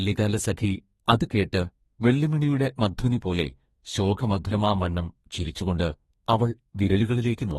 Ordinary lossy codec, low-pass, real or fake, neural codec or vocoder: AAC, 32 kbps; 14.4 kHz; fake; codec, 32 kHz, 1.9 kbps, SNAC